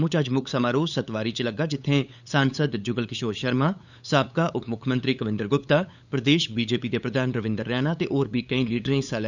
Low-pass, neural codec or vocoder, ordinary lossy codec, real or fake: 7.2 kHz; codec, 16 kHz, 8 kbps, FunCodec, trained on LibriTTS, 25 frames a second; none; fake